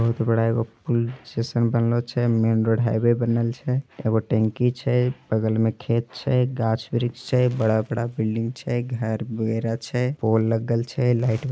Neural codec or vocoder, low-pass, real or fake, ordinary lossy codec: none; none; real; none